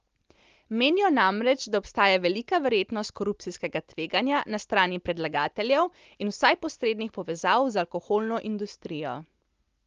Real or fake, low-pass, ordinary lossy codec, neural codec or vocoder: real; 7.2 kHz; Opus, 32 kbps; none